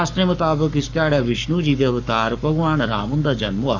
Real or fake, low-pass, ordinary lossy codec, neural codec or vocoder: fake; 7.2 kHz; none; codec, 44.1 kHz, 7.8 kbps, Pupu-Codec